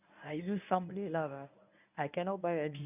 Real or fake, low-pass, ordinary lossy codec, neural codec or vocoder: fake; 3.6 kHz; none; codec, 24 kHz, 0.9 kbps, WavTokenizer, medium speech release version 1